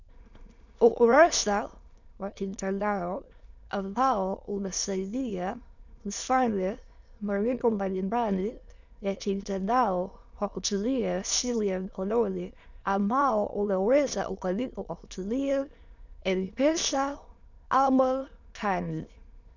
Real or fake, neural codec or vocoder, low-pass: fake; autoencoder, 22.05 kHz, a latent of 192 numbers a frame, VITS, trained on many speakers; 7.2 kHz